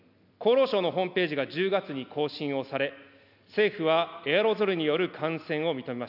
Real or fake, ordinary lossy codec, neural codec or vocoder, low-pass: real; none; none; 5.4 kHz